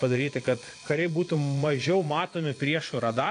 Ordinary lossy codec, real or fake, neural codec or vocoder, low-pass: AAC, 48 kbps; fake; vocoder, 22.05 kHz, 80 mel bands, Vocos; 9.9 kHz